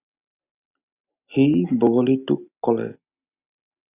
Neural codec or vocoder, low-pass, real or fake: none; 3.6 kHz; real